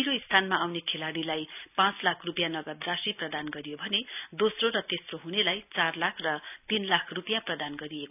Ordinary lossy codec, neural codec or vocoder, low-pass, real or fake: none; none; 3.6 kHz; real